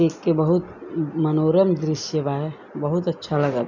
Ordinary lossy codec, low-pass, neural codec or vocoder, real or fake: none; 7.2 kHz; none; real